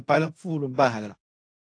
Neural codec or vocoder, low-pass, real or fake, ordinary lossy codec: codec, 16 kHz in and 24 kHz out, 0.4 kbps, LongCat-Audio-Codec, fine tuned four codebook decoder; 9.9 kHz; fake; AAC, 48 kbps